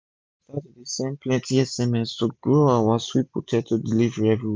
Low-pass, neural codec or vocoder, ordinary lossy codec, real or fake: none; none; none; real